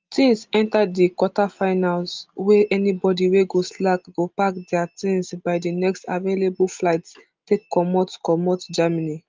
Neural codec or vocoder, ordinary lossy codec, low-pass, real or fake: none; Opus, 24 kbps; 7.2 kHz; real